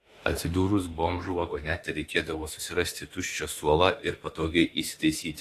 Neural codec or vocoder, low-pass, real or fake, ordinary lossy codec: autoencoder, 48 kHz, 32 numbers a frame, DAC-VAE, trained on Japanese speech; 14.4 kHz; fake; AAC, 48 kbps